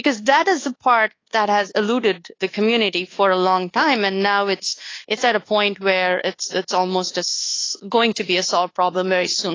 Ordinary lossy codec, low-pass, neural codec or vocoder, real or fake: AAC, 32 kbps; 7.2 kHz; codec, 24 kHz, 1.2 kbps, DualCodec; fake